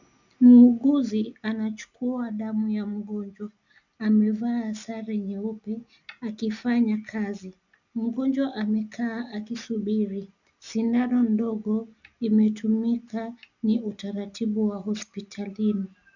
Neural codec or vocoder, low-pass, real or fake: none; 7.2 kHz; real